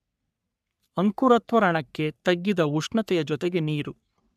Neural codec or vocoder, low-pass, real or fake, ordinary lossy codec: codec, 44.1 kHz, 3.4 kbps, Pupu-Codec; 14.4 kHz; fake; none